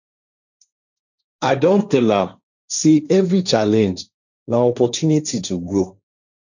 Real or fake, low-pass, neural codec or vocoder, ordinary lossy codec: fake; 7.2 kHz; codec, 16 kHz, 1.1 kbps, Voila-Tokenizer; none